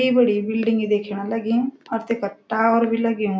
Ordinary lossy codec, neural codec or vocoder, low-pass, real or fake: none; none; none; real